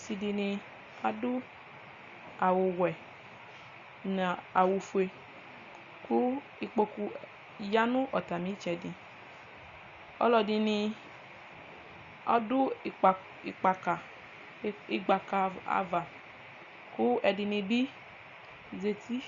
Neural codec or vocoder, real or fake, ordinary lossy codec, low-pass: none; real; Opus, 64 kbps; 7.2 kHz